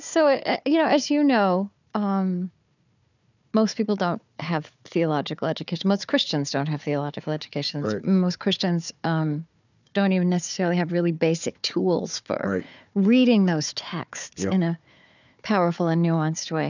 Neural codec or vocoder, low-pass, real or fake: codec, 16 kHz, 4 kbps, FunCodec, trained on Chinese and English, 50 frames a second; 7.2 kHz; fake